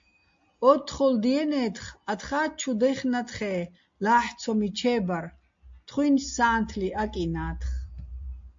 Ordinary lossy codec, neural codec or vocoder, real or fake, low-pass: MP3, 48 kbps; none; real; 7.2 kHz